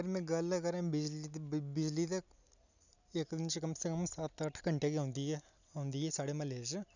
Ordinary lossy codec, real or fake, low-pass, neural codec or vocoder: none; real; 7.2 kHz; none